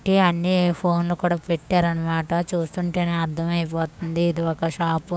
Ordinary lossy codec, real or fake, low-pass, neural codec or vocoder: none; fake; none; codec, 16 kHz, 6 kbps, DAC